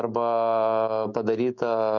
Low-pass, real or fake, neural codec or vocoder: 7.2 kHz; fake; autoencoder, 48 kHz, 128 numbers a frame, DAC-VAE, trained on Japanese speech